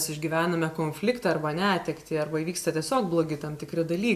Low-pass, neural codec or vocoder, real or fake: 14.4 kHz; none; real